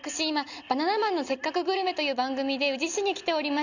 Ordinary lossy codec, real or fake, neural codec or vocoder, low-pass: none; real; none; 7.2 kHz